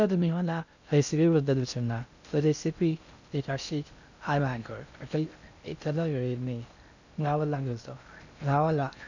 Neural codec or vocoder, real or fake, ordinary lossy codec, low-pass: codec, 16 kHz in and 24 kHz out, 0.6 kbps, FocalCodec, streaming, 2048 codes; fake; none; 7.2 kHz